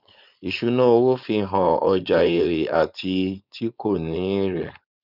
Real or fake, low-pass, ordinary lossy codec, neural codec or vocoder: fake; 5.4 kHz; none; codec, 16 kHz, 4.8 kbps, FACodec